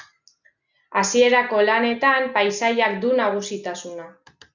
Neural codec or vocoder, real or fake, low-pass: none; real; 7.2 kHz